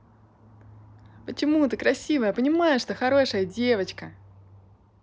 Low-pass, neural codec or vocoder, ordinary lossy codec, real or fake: none; none; none; real